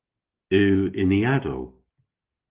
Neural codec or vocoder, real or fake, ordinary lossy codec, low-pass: none; real; Opus, 16 kbps; 3.6 kHz